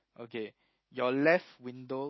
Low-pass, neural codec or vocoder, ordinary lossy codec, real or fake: 7.2 kHz; none; MP3, 24 kbps; real